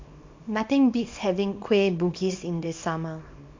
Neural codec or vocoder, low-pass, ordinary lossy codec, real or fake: codec, 24 kHz, 0.9 kbps, WavTokenizer, small release; 7.2 kHz; MP3, 48 kbps; fake